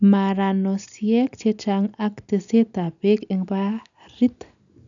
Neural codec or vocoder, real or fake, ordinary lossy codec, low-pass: none; real; none; 7.2 kHz